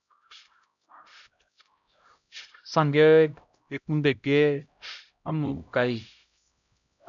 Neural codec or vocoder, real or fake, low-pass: codec, 16 kHz, 0.5 kbps, X-Codec, HuBERT features, trained on LibriSpeech; fake; 7.2 kHz